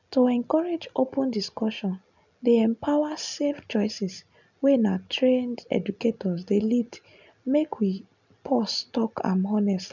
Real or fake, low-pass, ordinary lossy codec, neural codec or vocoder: real; 7.2 kHz; none; none